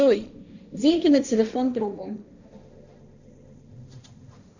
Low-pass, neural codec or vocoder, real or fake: 7.2 kHz; codec, 16 kHz, 1.1 kbps, Voila-Tokenizer; fake